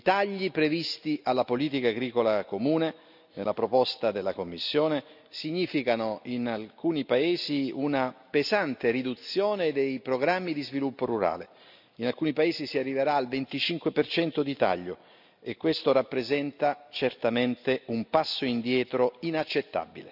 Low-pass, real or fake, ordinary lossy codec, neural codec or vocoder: 5.4 kHz; real; none; none